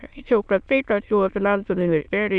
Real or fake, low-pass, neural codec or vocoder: fake; 9.9 kHz; autoencoder, 22.05 kHz, a latent of 192 numbers a frame, VITS, trained on many speakers